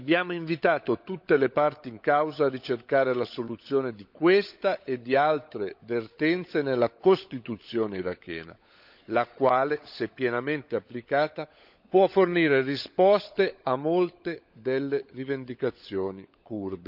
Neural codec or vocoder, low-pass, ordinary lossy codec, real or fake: codec, 16 kHz, 16 kbps, FunCodec, trained on LibriTTS, 50 frames a second; 5.4 kHz; none; fake